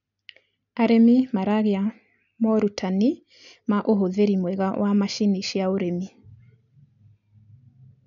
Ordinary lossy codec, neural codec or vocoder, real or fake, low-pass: none; none; real; 7.2 kHz